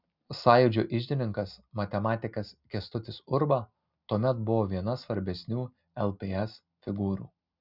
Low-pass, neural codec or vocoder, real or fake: 5.4 kHz; none; real